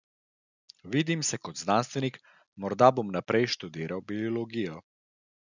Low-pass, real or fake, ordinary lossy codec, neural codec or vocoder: 7.2 kHz; real; none; none